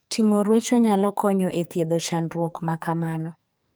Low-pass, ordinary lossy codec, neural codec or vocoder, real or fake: none; none; codec, 44.1 kHz, 2.6 kbps, SNAC; fake